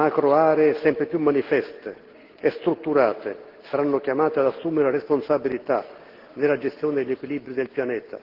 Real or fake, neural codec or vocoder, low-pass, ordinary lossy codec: real; none; 5.4 kHz; Opus, 16 kbps